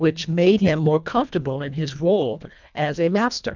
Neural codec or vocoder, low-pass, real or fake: codec, 24 kHz, 1.5 kbps, HILCodec; 7.2 kHz; fake